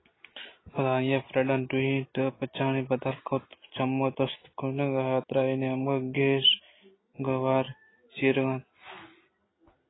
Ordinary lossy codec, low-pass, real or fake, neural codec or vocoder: AAC, 16 kbps; 7.2 kHz; real; none